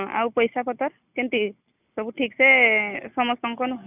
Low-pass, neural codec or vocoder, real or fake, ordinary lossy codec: 3.6 kHz; none; real; none